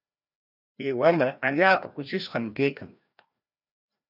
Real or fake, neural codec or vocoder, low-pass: fake; codec, 16 kHz, 1 kbps, FreqCodec, larger model; 5.4 kHz